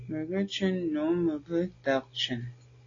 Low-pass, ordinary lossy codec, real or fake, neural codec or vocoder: 7.2 kHz; AAC, 32 kbps; real; none